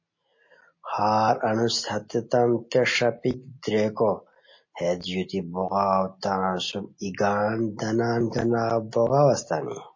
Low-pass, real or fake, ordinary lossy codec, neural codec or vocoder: 7.2 kHz; real; MP3, 32 kbps; none